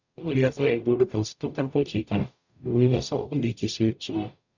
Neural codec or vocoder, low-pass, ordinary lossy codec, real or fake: codec, 44.1 kHz, 0.9 kbps, DAC; 7.2 kHz; none; fake